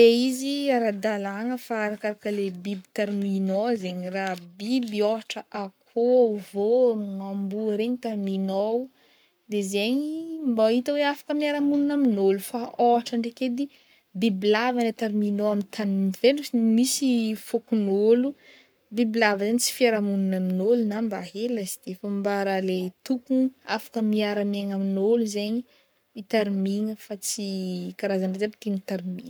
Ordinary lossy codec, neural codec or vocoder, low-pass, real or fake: none; codec, 44.1 kHz, 7.8 kbps, Pupu-Codec; none; fake